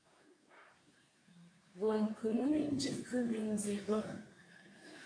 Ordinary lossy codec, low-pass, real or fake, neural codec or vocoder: MP3, 96 kbps; 9.9 kHz; fake; codec, 24 kHz, 1 kbps, SNAC